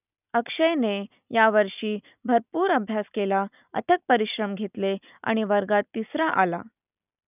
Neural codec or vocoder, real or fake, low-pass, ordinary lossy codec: none; real; 3.6 kHz; none